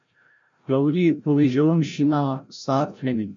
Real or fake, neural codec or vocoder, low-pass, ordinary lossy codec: fake; codec, 16 kHz, 0.5 kbps, FreqCodec, larger model; 7.2 kHz; MP3, 48 kbps